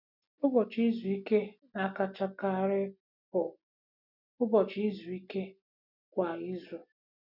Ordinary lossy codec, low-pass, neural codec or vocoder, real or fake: none; 5.4 kHz; none; real